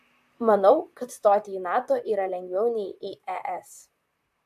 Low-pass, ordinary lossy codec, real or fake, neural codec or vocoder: 14.4 kHz; AAC, 96 kbps; fake; vocoder, 44.1 kHz, 128 mel bands, Pupu-Vocoder